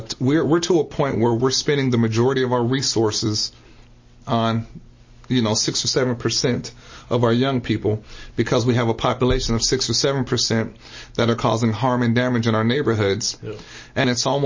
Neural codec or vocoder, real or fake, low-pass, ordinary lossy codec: none; real; 7.2 kHz; MP3, 32 kbps